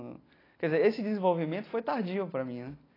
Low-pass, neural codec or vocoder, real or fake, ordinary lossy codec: 5.4 kHz; none; real; AAC, 24 kbps